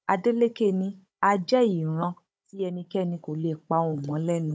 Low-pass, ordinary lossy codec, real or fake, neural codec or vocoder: none; none; fake; codec, 16 kHz, 16 kbps, FunCodec, trained on Chinese and English, 50 frames a second